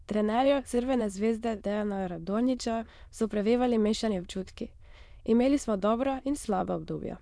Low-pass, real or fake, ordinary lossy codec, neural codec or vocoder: none; fake; none; autoencoder, 22.05 kHz, a latent of 192 numbers a frame, VITS, trained on many speakers